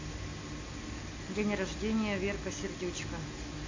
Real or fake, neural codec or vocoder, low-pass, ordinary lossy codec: real; none; 7.2 kHz; AAC, 48 kbps